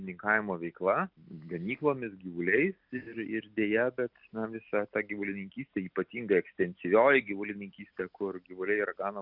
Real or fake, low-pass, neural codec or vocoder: real; 5.4 kHz; none